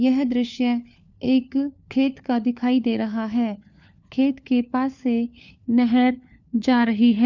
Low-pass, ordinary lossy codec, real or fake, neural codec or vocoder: 7.2 kHz; none; fake; codec, 16 kHz, 4 kbps, FunCodec, trained on LibriTTS, 50 frames a second